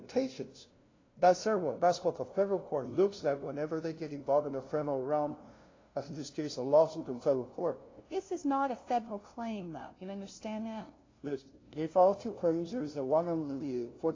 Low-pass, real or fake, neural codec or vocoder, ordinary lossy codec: 7.2 kHz; fake; codec, 16 kHz, 0.5 kbps, FunCodec, trained on LibriTTS, 25 frames a second; AAC, 32 kbps